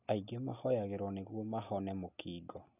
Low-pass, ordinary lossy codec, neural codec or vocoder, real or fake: 3.6 kHz; none; none; real